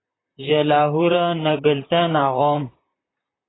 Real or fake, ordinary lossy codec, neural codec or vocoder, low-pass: fake; AAC, 16 kbps; vocoder, 44.1 kHz, 128 mel bands, Pupu-Vocoder; 7.2 kHz